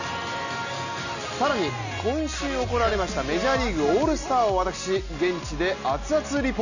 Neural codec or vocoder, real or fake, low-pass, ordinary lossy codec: none; real; 7.2 kHz; none